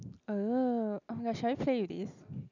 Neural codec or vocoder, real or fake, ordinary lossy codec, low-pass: none; real; none; 7.2 kHz